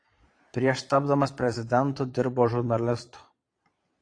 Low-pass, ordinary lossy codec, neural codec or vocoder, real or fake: 9.9 kHz; AAC, 48 kbps; vocoder, 22.05 kHz, 80 mel bands, Vocos; fake